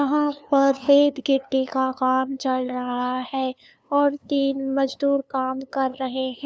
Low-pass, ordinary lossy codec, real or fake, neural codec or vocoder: none; none; fake; codec, 16 kHz, 2 kbps, FunCodec, trained on LibriTTS, 25 frames a second